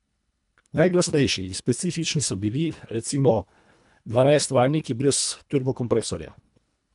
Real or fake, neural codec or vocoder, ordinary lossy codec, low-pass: fake; codec, 24 kHz, 1.5 kbps, HILCodec; none; 10.8 kHz